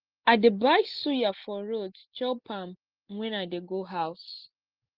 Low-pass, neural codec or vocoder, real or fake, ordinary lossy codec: 5.4 kHz; none; real; Opus, 16 kbps